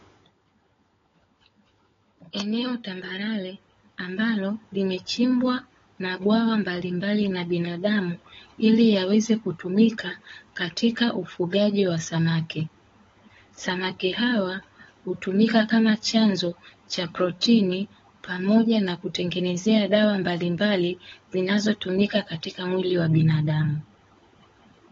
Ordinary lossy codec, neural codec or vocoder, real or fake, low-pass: AAC, 24 kbps; codec, 16 kHz, 16 kbps, FunCodec, trained on LibriTTS, 50 frames a second; fake; 7.2 kHz